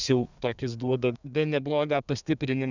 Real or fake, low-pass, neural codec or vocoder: fake; 7.2 kHz; codec, 32 kHz, 1.9 kbps, SNAC